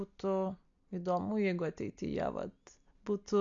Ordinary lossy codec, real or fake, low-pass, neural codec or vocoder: AAC, 64 kbps; real; 7.2 kHz; none